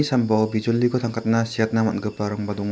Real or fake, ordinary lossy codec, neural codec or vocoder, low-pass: real; none; none; none